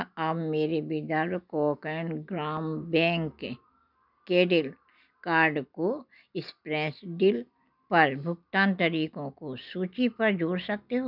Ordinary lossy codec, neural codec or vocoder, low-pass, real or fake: none; none; 5.4 kHz; real